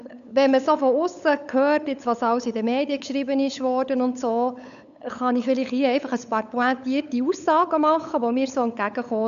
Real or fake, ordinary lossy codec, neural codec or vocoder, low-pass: fake; MP3, 96 kbps; codec, 16 kHz, 8 kbps, FunCodec, trained on LibriTTS, 25 frames a second; 7.2 kHz